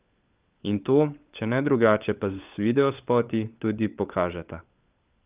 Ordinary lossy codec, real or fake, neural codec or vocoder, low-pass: Opus, 24 kbps; fake; codec, 16 kHz, 16 kbps, FunCodec, trained on LibriTTS, 50 frames a second; 3.6 kHz